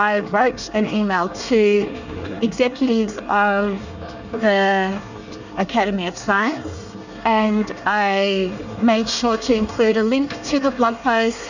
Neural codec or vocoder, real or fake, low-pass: codec, 24 kHz, 1 kbps, SNAC; fake; 7.2 kHz